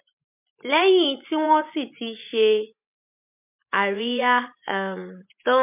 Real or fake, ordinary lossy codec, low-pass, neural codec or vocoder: fake; MP3, 32 kbps; 3.6 kHz; vocoder, 24 kHz, 100 mel bands, Vocos